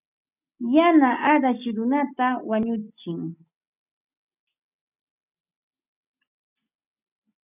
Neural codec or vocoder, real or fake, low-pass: none; real; 3.6 kHz